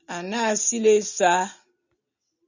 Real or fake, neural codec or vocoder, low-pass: real; none; 7.2 kHz